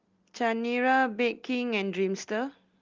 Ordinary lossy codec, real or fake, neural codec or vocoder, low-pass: Opus, 32 kbps; real; none; 7.2 kHz